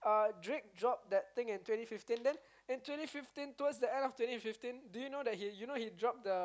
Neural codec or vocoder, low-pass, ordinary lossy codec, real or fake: none; none; none; real